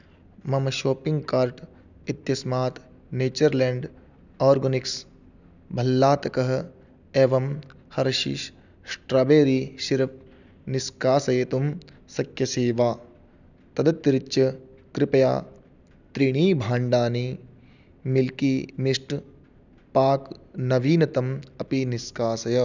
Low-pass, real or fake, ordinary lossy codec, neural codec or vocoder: 7.2 kHz; real; none; none